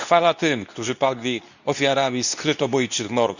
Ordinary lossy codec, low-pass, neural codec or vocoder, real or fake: none; 7.2 kHz; codec, 24 kHz, 0.9 kbps, WavTokenizer, medium speech release version 2; fake